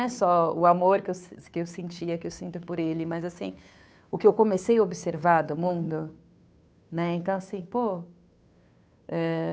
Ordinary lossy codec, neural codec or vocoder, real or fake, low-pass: none; codec, 16 kHz, 2 kbps, FunCodec, trained on Chinese and English, 25 frames a second; fake; none